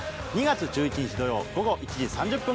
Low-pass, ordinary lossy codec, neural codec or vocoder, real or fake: none; none; none; real